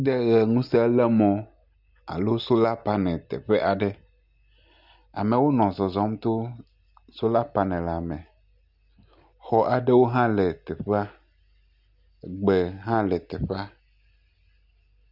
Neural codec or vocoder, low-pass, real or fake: none; 5.4 kHz; real